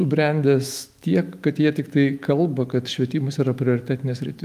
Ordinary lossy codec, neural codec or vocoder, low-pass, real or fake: Opus, 32 kbps; autoencoder, 48 kHz, 128 numbers a frame, DAC-VAE, trained on Japanese speech; 14.4 kHz; fake